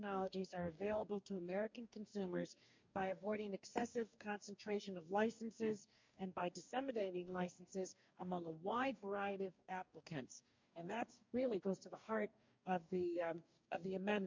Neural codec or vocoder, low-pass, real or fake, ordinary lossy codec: codec, 44.1 kHz, 2.6 kbps, DAC; 7.2 kHz; fake; MP3, 48 kbps